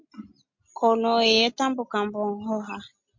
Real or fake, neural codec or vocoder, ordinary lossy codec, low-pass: real; none; MP3, 48 kbps; 7.2 kHz